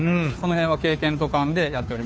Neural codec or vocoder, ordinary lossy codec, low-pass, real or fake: codec, 16 kHz, 2 kbps, FunCodec, trained on Chinese and English, 25 frames a second; none; none; fake